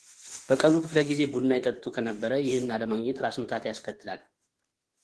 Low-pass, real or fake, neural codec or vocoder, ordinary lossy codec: 10.8 kHz; fake; autoencoder, 48 kHz, 32 numbers a frame, DAC-VAE, trained on Japanese speech; Opus, 16 kbps